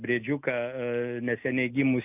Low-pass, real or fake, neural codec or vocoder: 3.6 kHz; real; none